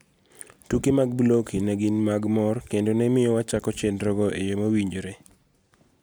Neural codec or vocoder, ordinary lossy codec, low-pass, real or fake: none; none; none; real